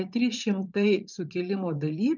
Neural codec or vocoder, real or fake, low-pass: vocoder, 44.1 kHz, 80 mel bands, Vocos; fake; 7.2 kHz